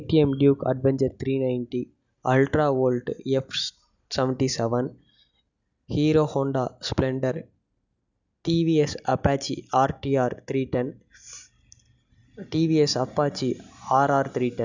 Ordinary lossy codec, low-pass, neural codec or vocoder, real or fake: none; 7.2 kHz; none; real